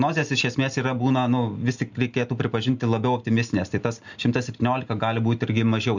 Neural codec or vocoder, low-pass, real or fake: none; 7.2 kHz; real